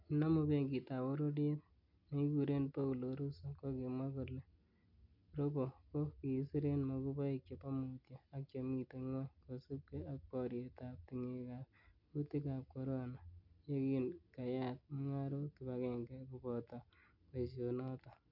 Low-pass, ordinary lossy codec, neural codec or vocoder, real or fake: 5.4 kHz; none; none; real